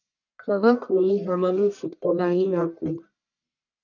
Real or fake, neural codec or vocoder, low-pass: fake; codec, 44.1 kHz, 1.7 kbps, Pupu-Codec; 7.2 kHz